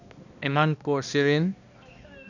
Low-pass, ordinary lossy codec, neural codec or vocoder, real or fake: 7.2 kHz; none; codec, 16 kHz, 1 kbps, X-Codec, HuBERT features, trained on balanced general audio; fake